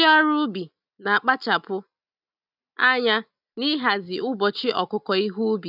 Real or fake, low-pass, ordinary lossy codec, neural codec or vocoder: real; 5.4 kHz; none; none